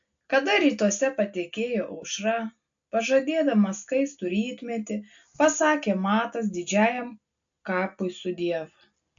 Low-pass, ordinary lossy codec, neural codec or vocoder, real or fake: 7.2 kHz; AAC, 64 kbps; none; real